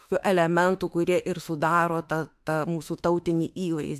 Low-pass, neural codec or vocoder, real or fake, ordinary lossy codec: 14.4 kHz; autoencoder, 48 kHz, 32 numbers a frame, DAC-VAE, trained on Japanese speech; fake; AAC, 96 kbps